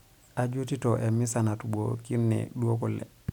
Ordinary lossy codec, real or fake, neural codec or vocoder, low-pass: none; real; none; 19.8 kHz